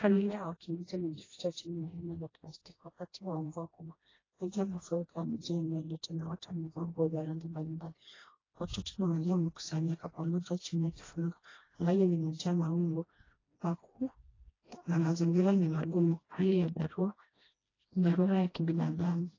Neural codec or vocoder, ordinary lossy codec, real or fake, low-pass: codec, 16 kHz, 1 kbps, FreqCodec, smaller model; AAC, 32 kbps; fake; 7.2 kHz